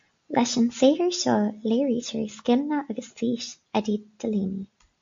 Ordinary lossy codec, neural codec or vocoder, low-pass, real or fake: MP3, 48 kbps; none; 7.2 kHz; real